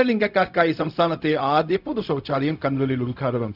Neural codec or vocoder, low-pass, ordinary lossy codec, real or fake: codec, 16 kHz, 0.4 kbps, LongCat-Audio-Codec; 5.4 kHz; none; fake